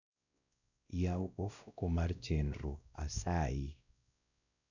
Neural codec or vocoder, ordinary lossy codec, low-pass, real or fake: codec, 16 kHz, 1 kbps, X-Codec, WavLM features, trained on Multilingual LibriSpeech; none; 7.2 kHz; fake